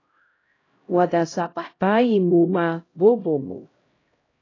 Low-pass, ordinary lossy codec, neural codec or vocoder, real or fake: 7.2 kHz; AAC, 32 kbps; codec, 16 kHz, 0.5 kbps, X-Codec, HuBERT features, trained on LibriSpeech; fake